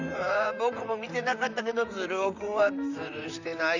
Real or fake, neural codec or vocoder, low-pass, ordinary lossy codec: fake; vocoder, 44.1 kHz, 128 mel bands, Pupu-Vocoder; 7.2 kHz; none